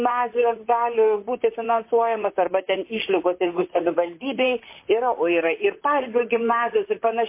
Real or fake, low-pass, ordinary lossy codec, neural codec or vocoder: fake; 3.6 kHz; MP3, 24 kbps; vocoder, 44.1 kHz, 128 mel bands, Pupu-Vocoder